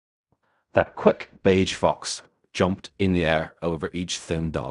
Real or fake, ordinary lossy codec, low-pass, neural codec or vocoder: fake; none; 10.8 kHz; codec, 16 kHz in and 24 kHz out, 0.4 kbps, LongCat-Audio-Codec, fine tuned four codebook decoder